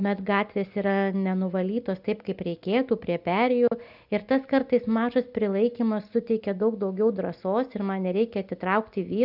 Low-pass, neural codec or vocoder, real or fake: 5.4 kHz; none; real